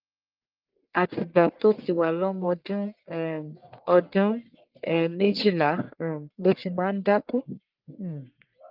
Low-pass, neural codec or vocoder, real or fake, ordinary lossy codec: 5.4 kHz; codec, 44.1 kHz, 1.7 kbps, Pupu-Codec; fake; Opus, 24 kbps